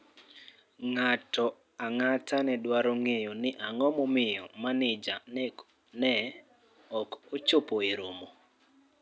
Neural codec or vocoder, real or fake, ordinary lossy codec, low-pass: none; real; none; none